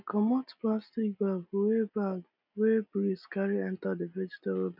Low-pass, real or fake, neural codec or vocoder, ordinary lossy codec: 5.4 kHz; real; none; none